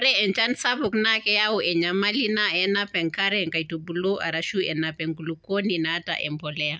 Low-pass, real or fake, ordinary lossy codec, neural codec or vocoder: none; real; none; none